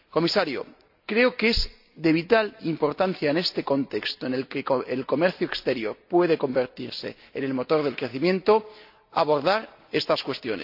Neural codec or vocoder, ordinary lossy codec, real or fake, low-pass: none; AAC, 48 kbps; real; 5.4 kHz